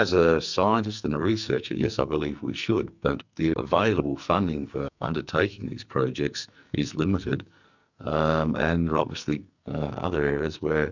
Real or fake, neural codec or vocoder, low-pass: fake; codec, 44.1 kHz, 2.6 kbps, SNAC; 7.2 kHz